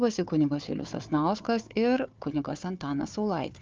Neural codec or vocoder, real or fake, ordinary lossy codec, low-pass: codec, 16 kHz, 4 kbps, FunCodec, trained on Chinese and English, 50 frames a second; fake; Opus, 24 kbps; 7.2 kHz